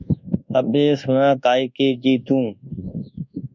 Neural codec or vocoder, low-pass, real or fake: codec, 24 kHz, 1.2 kbps, DualCodec; 7.2 kHz; fake